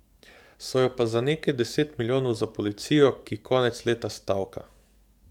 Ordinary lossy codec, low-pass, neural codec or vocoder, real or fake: MP3, 96 kbps; 19.8 kHz; codec, 44.1 kHz, 7.8 kbps, DAC; fake